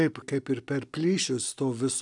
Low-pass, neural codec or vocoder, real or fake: 10.8 kHz; none; real